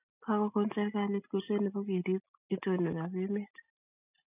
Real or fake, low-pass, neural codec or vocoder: real; 3.6 kHz; none